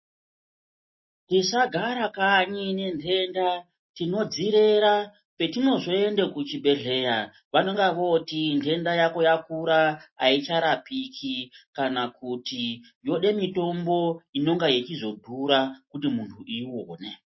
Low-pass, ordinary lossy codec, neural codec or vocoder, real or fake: 7.2 kHz; MP3, 24 kbps; none; real